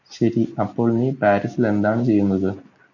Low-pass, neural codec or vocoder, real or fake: 7.2 kHz; none; real